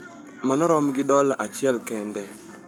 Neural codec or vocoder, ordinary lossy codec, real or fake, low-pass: codec, 44.1 kHz, 7.8 kbps, Pupu-Codec; none; fake; 19.8 kHz